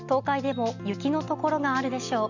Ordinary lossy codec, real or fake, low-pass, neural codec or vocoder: none; real; 7.2 kHz; none